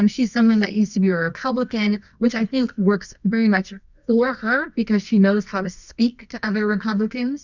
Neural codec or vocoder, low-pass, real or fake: codec, 24 kHz, 0.9 kbps, WavTokenizer, medium music audio release; 7.2 kHz; fake